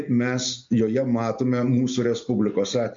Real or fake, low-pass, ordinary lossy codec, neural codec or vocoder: real; 7.2 kHz; MP3, 48 kbps; none